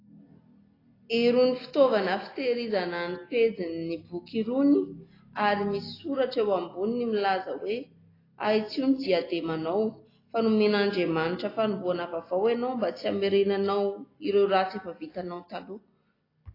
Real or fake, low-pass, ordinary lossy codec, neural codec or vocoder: real; 5.4 kHz; AAC, 24 kbps; none